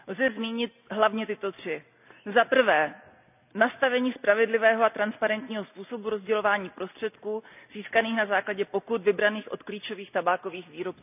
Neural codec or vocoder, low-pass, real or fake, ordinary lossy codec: none; 3.6 kHz; real; none